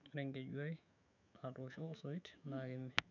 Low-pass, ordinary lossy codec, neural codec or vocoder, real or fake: 7.2 kHz; none; autoencoder, 48 kHz, 128 numbers a frame, DAC-VAE, trained on Japanese speech; fake